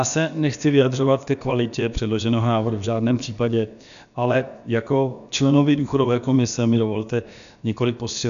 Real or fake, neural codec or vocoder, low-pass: fake; codec, 16 kHz, about 1 kbps, DyCAST, with the encoder's durations; 7.2 kHz